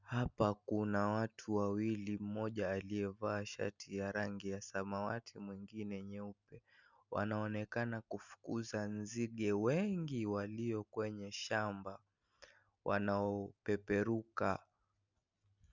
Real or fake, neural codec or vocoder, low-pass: real; none; 7.2 kHz